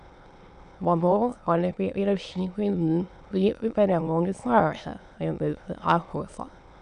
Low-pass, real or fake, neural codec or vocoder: 9.9 kHz; fake; autoencoder, 22.05 kHz, a latent of 192 numbers a frame, VITS, trained on many speakers